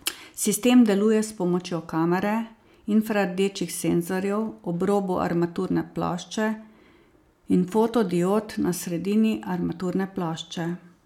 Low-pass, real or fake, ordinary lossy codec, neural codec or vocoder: 19.8 kHz; real; MP3, 96 kbps; none